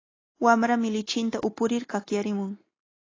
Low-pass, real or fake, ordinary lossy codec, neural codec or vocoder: 7.2 kHz; real; AAC, 32 kbps; none